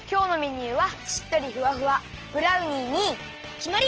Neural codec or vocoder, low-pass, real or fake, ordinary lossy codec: none; 7.2 kHz; real; Opus, 16 kbps